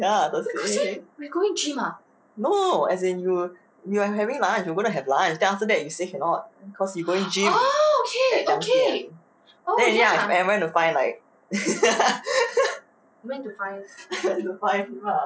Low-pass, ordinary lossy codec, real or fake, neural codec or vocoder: none; none; real; none